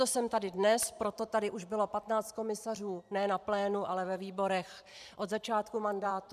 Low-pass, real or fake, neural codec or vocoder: 14.4 kHz; fake; vocoder, 44.1 kHz, 128 mel bands every 512 samples, BigVGAN v2